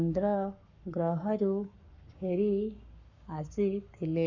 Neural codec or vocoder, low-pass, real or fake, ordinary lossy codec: codec, 44.1 kHz, 7.8 kbps, Pupu-Codec; 7.2 kHz; fake; none